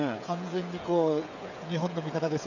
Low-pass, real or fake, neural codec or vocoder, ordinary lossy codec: 7.2 kHz; fake; codec, 16 kHz, 16 kbps, FreqCodec, smaller model; none